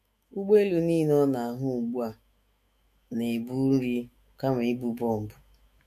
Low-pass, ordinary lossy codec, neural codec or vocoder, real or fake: 14.4 kHz; MP3, 64 kbps; autoencoder, 48 kHz, 128 numbers a frame, DAC-VAE, trained on Japanese speech; fake